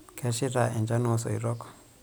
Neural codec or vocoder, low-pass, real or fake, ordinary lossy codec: none; none; real; none